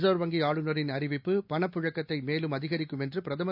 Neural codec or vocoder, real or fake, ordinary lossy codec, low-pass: none; real; none; 5.4 kHz